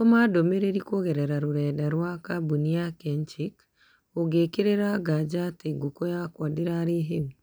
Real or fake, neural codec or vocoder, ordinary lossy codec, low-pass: real; none; none; none